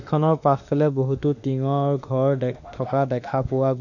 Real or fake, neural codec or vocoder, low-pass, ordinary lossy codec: fake; autoencoder, 48 kHz, 32 numbers a frame, DAC-VAE, trained on Japanese speech; 7.2 kHz; none